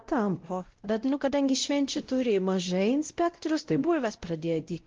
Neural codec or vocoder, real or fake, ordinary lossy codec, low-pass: codec, 16 kHz, 0.5 kbps, X-Codec, WavLM features, trained on Multilingual LibriSpeech; fake; Opus, 16 kbps; 7.2 kHz